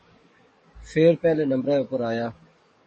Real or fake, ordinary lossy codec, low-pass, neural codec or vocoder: fake; MP3, 32 kbps; 10.8 kHz; codec, 44.1 kHz, 7.8 kbps, DAC